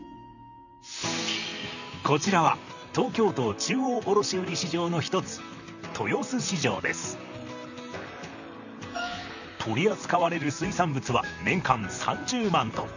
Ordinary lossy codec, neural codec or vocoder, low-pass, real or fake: none; vocoder, 44.1 kHz, 128 mel bands, Pupu-Vocoder; 7.2 kHz; fake